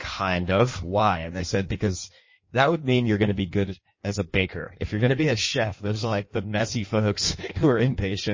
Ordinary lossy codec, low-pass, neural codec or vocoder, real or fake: MP3, 32 kbps; 7.2 kHz; codec, 16 kHz in and 24 kHz out, 1.1 kbps, FireRedTTS-2 codec; fake